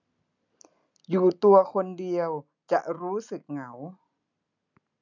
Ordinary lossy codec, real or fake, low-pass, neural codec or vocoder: none; real; 7.2 kHz; none